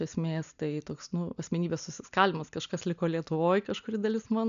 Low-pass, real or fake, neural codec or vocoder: 7.2 kHz; real; none